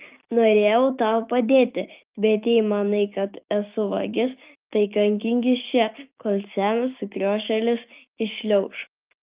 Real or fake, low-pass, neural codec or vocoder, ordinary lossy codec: fake; 3.6 kHz; codec, 16 kHz, 6 kbps, DAC; Opus, 24 kbps